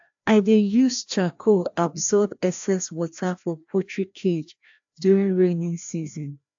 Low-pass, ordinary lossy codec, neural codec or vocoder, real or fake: 7.2 kHz; AAC, 64 kbps; codec, 16 kHz, 1 kbps, FreqCodec, larger model; fake